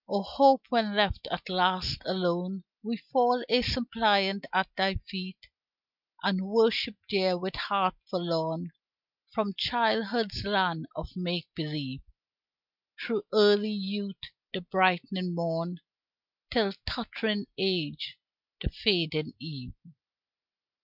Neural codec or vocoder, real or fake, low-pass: none; real; 5.4 kHz